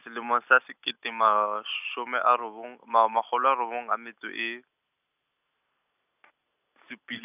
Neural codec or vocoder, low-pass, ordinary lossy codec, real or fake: none; 3.6 kHz; none; real